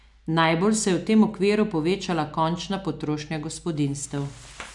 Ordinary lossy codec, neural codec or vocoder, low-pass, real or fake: none; none; 10.8 kHz; real